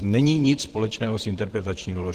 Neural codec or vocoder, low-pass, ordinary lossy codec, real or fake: vocoder, 44.1 kHz, 128 mel bands, Pupu-Vocoder; 14.4 kHz; Opus, 16 kbps; fake